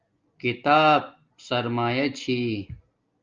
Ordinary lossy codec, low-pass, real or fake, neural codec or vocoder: Opus, 24 kbps; 7.2 kHz; real; none